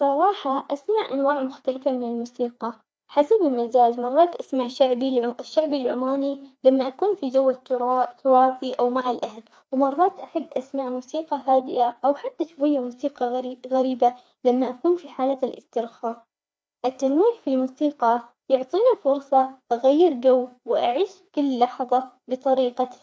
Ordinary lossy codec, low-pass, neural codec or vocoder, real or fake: none; none; codec, 16 kHz, 2 kbps, FreqCodec, larger model; fake